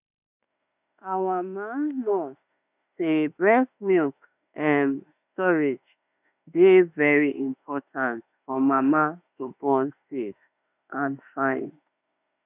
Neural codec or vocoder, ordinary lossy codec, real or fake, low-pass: autoencoder, 48 kHz, 32 numbers a frame, DAC-VAE, trained on Japanese speech; none; fake; 3.6 kHz